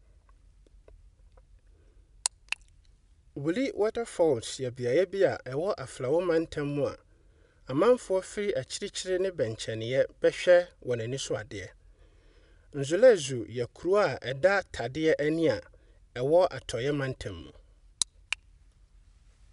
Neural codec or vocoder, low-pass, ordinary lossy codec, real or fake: none; 10.8 kHz; none; real